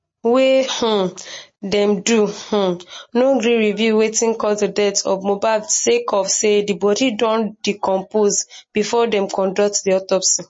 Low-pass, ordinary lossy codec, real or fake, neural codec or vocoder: 9.9 kHz; MP3, 32 kbps; real; none